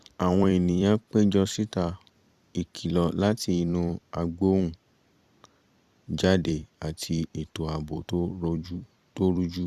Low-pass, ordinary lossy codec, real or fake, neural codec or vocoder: 14.4 kHz; Opus, 64 kbps; fake; vocoder, 44.1 kHz, 128 mel bands every 256 samples, BigVGAN v2